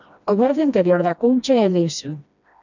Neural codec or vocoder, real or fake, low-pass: codec, 16 kHz, 1 kbps, FreqCodec, smaller model; fake; 7.2 kHz